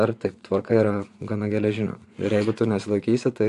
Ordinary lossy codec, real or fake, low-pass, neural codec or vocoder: AAC, 64 kbps; fake; 10.8 kHz; vocoder, 24 kHz, 100 mel bands, Vocos